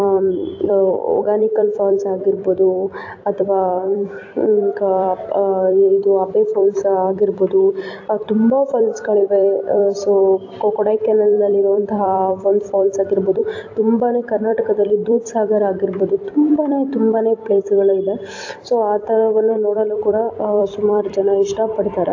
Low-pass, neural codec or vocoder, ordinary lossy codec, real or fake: 7.2 kHz; vocoder, 44.1 kHz, 128 mel bands every 256 samples, BigVGAN v2; AAC, 48 kbps; fake